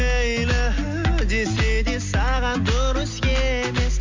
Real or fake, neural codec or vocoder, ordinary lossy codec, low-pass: real; none; MP3, 48 kbps; 7.2 kHz